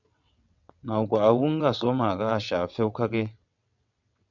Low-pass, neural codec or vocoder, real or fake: 7.2 kHz; vocoder, 22.05 kHz, 80 mel bands, WaveNeXt; fake